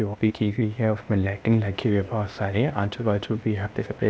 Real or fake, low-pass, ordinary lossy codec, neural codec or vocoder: fake; none; none; codec, 16 kHz, 0.8 kbps, ZipCodec